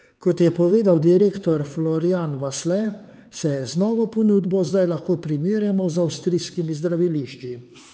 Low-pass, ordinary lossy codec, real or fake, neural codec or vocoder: none; none; fake; codec, 16 kHz, 4 kbps, X-Codec, HuBERT features, trained on LibriSpeech